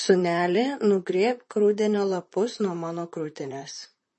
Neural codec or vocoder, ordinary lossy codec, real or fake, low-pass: vocoder, 44.1 kHz, 128 mel bands, Pupu-Vocoder; MP3, 32 kbps; fake; 10.8 kHz